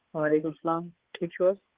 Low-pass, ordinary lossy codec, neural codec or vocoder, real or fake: 3.6 kHz; Opus, 16 kbps; codec, 16 kHz, 4 kbps, X-Codec, HuBERT features, trained on balanced general audio; fake